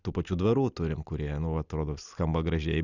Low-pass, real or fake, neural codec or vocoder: 7.2 kHz; real; none